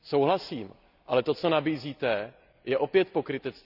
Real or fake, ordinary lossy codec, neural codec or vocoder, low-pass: real; none; none; 5.4 kHz